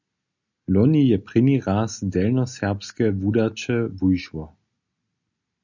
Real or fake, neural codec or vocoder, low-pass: real; none; 7.2 kHz